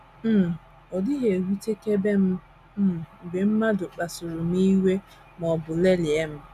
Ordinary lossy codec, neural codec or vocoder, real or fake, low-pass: none; none; real; 14.4 kHz